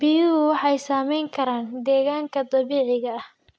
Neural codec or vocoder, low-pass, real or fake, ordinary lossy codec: none; none; real; none